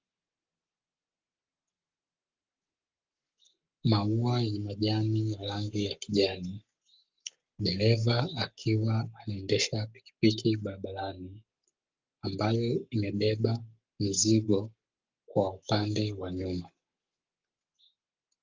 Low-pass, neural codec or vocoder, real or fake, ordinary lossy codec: 7.2 kHz; codec, 44.1 kHz, 7.8 kbps, Pupu-Codec; fake; Opus, 24 kbps